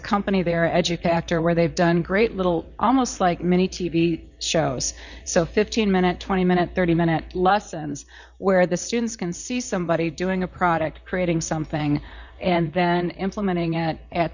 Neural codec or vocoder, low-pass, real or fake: vocoder, 44.1 kHz, 128 mel bands, Pupu-Vocoder; 7.2 kHz; fake